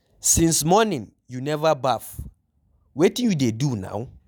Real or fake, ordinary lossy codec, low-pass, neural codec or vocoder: real; none; none; none